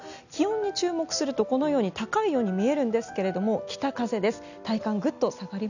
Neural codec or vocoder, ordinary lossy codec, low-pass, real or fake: none; none; 7.2 kHz; real